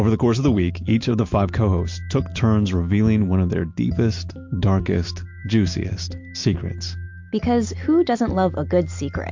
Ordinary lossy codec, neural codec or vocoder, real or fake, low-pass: MP3, 48 kbps; none; real; 7.2 kHz